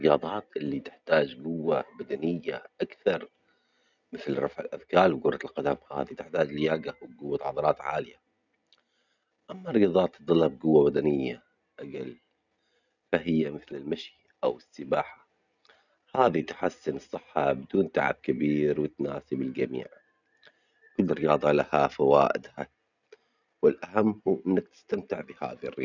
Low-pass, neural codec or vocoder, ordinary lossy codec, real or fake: 7.2 kHz; none; none; real